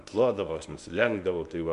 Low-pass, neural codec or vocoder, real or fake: 10.8 kHz; codec, 24 kHz, 0.9 kbps, WavTokenizer, medium speech release version 1; fake